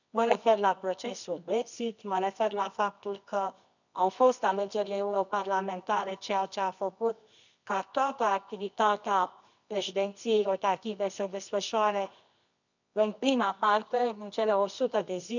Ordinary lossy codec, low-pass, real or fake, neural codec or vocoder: none; 7.2 kHz; fake; codec, 24 kHz, 0.9 kbps, WavTokenizer, medium music audio release